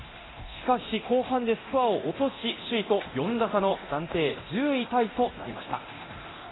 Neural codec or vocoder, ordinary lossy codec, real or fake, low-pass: codec, 24 kHz, 0.9 kbps, DualCodec; AAC, 16 kbps; fake; 7.2 kHz